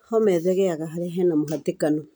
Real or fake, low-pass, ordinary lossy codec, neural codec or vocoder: real; none; none; none